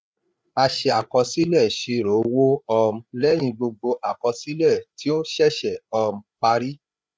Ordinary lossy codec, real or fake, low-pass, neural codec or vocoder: none; fake; none; codec, 16 kHz, 8 kbps, FreqCodec, larger model